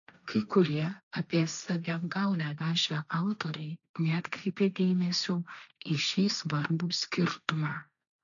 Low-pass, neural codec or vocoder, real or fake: 7.2 kHz; codec, 16 kHz, 1.1 kbps, Voila-Tokenizer; fake